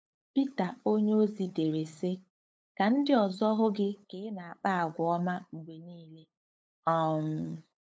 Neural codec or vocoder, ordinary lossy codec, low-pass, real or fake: codec, 16 kHz, 8 kbps, FunCodec, trained on LibriTTS, 25 frames a second; none; none; fake